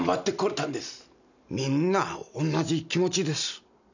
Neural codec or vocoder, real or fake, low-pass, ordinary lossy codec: none; real; 7.2 kHz; none